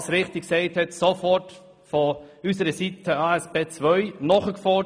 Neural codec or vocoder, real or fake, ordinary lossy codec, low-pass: none; real; none; none